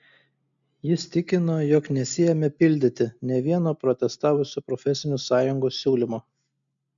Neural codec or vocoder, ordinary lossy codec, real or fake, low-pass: none; MP3, 64 kbps; real; 7.2 kHz